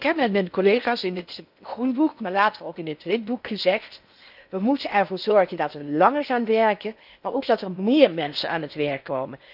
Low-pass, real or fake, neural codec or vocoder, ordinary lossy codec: 5.4 kHz; fake; codec, 16 kHz in and 24 kHz out, 0.8 kbps, FocalCodec, streaming, 65536 codes; none